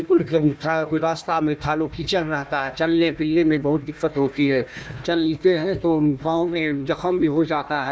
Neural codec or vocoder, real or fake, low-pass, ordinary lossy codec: codec, 16 kHz, 1 kbps, FunCodec, trained on Chinese and English, 50 frames a second; fake; none; none